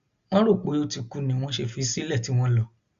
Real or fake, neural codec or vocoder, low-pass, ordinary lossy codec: real; none; 7.2 kHz; Opus, 64 kbps